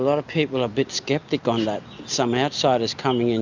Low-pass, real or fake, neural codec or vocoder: 7.2 kHz; real; none